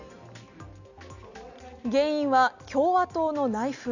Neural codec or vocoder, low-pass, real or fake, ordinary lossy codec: none; 7.2 kHz; real; none